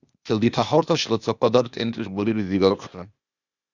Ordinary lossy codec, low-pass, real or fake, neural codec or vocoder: Opus, 64 kbps; 7.2 kHz; fake; codec, 16 kHz, 0.8 kbps, ZipCodec